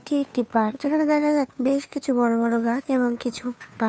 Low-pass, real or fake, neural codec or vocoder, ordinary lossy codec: none; fake; codec, 16 kHz, 2 kbps, FunCodec, trained on Chinese and English, 25 frames a second; none